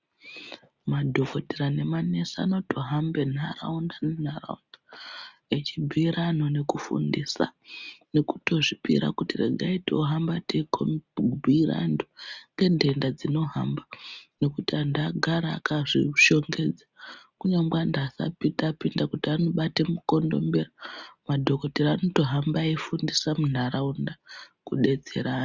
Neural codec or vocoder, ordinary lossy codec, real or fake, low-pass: none; Opus, 64 kbps; real; 7.2 kHz